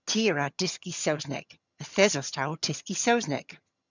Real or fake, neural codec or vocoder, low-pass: fake; vocoder, 22.05 kHz, 80 mel bands, HiFi-GAN; 7.2 kHz